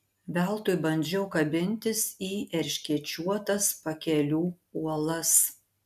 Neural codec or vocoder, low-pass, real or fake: none; 14.4 kHz; real